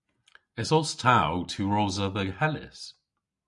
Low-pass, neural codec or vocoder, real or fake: 10.8 kHz; none; real